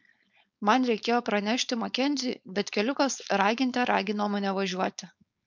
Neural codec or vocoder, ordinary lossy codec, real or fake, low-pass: codec, 16 kHz, 4.8 kbps, FACodec; MP3, 64 kbps; fake; 7.2 kHz